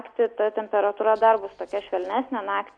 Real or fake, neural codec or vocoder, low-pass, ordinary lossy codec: real; none; 9.9 kHz; MP3, 96 kbps